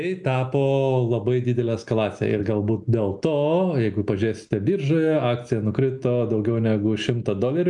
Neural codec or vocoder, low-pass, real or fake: none; 10.8 kHz; real